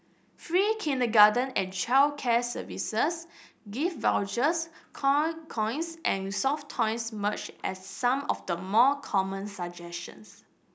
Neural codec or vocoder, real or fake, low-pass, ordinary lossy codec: none; real; none; none